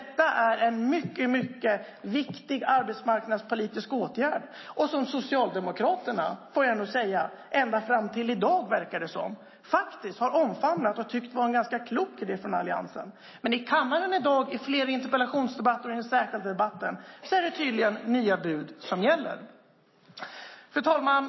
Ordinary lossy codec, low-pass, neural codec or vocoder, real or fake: MP3, 24 kbps; 7.2 kHz; none; real